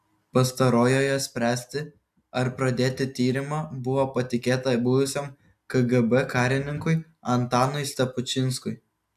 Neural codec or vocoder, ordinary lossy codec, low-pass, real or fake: none; AAC, 96 kbps; 14.4 kHz; real